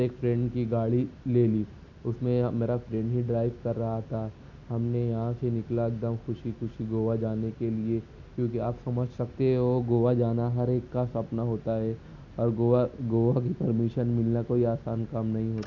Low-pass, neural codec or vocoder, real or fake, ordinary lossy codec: 7.2 kHz; none; real; none